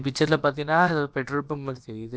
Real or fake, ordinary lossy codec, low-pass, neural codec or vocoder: fake; none; none; codec, 16 kHz, about 1 kbps, DyCAST, with the encoder's durations